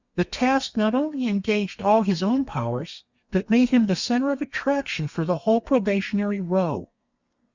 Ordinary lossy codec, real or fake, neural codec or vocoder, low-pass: Opus, 64 kbps; fake; codec, 32 kHz, 1.9 kbps, SNAC; 7.2 kHz